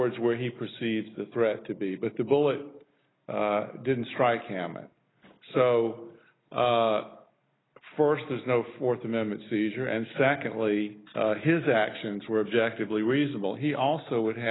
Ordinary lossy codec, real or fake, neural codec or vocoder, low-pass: AAC, 16 kbps; real; none; 7.2 kHz